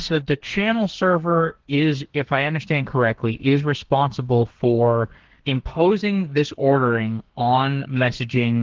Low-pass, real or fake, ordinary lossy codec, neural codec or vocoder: 7.2 kHz; fake; Opus, 16 kbps; codec, 32 kHz, 1.9 kbps, SNAC